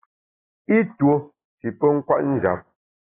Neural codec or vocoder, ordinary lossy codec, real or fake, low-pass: none; AAC, 16 kbps; real; 3.6 kHz